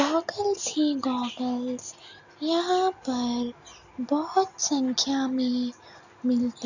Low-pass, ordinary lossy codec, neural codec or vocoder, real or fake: 7.2 kHz; none; none; real